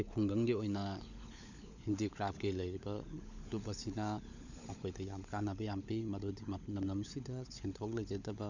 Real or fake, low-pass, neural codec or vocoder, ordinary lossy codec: fake; 7.2 kHz; codec, 16 kHz, 16 kbps, FunCodec, trained on LibriTTS, 50 frames a second; none